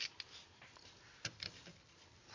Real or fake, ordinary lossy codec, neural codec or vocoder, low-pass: real; MP3, 48 kbps; none; 7.2 kHz